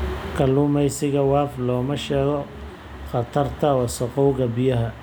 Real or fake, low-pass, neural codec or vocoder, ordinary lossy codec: real; none; none; none